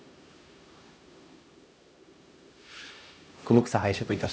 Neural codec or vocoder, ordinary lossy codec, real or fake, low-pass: codec, 16 kHz, 1 kbps, X-Codec, HuBERT features, trained on LibriSpeech; none; fake; none